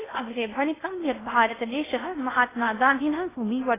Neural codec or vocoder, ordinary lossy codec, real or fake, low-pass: codec, 16 kHz in and 24 kHz out, 0.6 kbps, FocalCodec, streaming, 2048 codes; AAC, 16 kbps; fake; 3.6 kHz